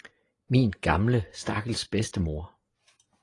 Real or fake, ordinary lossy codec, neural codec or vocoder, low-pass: real; AAC, 32 kbps; none; 10.8 kHz